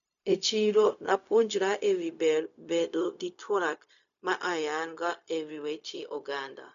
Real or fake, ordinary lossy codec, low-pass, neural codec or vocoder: fake; none; 7.2 kHz; codec, 16 kHz, 0.4 kbps, LongCat-Audio-Codec